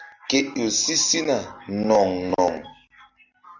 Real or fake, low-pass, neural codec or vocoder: real; 7.2 kHz; none